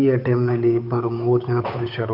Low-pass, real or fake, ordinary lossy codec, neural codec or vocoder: 5.4 kHz; fake; none; codec, 16 kHz, 8 kbps, FunCodec, trained on Chinese and English, 25 frames a second